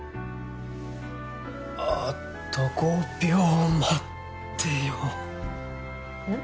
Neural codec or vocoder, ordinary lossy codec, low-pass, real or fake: none; none; none; real